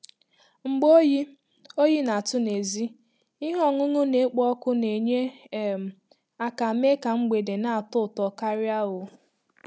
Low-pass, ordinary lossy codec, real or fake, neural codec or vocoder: none; none; real; none